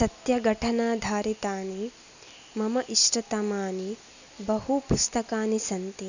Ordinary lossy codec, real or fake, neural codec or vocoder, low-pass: none; real; none; 7.2 kHz